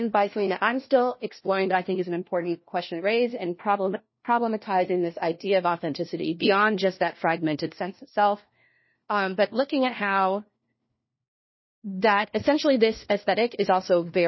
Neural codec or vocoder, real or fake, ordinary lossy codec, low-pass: codec, 16 kHz, 1 kbps, FunCodec, trained on LibriTTS, 50 frames a second; fake; MP3, 24 kbps; 7.2 kHz